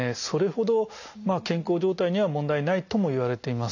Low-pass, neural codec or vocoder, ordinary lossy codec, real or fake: 7.2 kHz; none; none; real